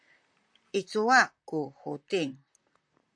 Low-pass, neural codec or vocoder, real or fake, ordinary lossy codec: 9.9 kHz; vocoder, 44.1 kHz, 128 mel bands, Pupu-Vocoder; fake; AAC, 64 kbps